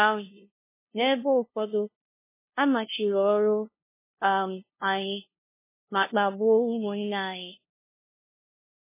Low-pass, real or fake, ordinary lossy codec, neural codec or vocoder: 3.6 kHz; fake; MP3, 16 kbps; codec, 16 kHz, 0.5 kbps, FunCodec, trained on LibriTTS, 25 frames a second